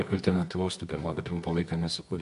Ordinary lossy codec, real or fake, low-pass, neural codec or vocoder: MP3, 64 kbps; fake; 10.8 kHz; codec, 24 kHz, 0.9 kbps, WavTokenizer, medium music audio release